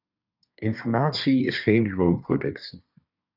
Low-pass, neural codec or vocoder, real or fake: 5.4 kHz; codec, 24 kHz, 1 kbps, SNAC; fake